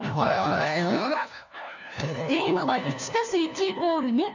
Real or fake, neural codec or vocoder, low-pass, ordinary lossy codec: fake; codec, 16 kHz, 1 kbps, FunCodec, trained on LibriTTS, 50 frames a second; 7.2 kHz; none